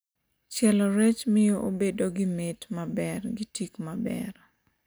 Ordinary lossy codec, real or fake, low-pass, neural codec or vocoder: none; real; none; none